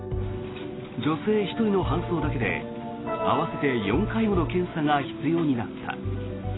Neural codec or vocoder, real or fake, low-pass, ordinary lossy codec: none; real; 7.2 kHz; AAC, 16 kbps